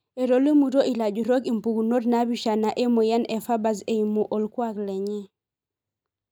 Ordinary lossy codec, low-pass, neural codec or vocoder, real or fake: none; 19.8 kHz; none; real